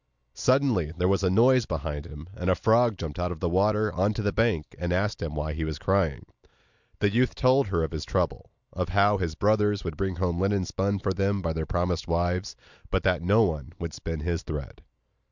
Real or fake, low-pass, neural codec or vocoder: real; 7.2 kHz; none